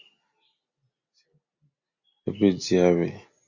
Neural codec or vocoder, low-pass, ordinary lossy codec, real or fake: none; 7.2 kHz; Opus, 64 kbps; real